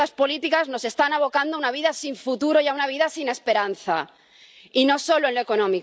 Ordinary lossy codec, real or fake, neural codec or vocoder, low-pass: none; real; none; none